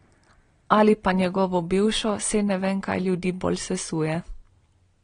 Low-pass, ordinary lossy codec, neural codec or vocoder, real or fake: 9.9 kHz; AAC, 32 kbps; none; real